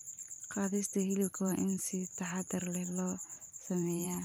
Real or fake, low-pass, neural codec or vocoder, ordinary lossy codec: fake; none; vocoder, 44.1 kHz, 128 mel bands every 512 samples, BigVGAN v2; none